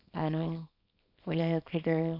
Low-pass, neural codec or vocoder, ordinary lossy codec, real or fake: 5.4 kHz; codec, 24 kHz, 0.9 kbps, WavTokenizer, small release; none; fake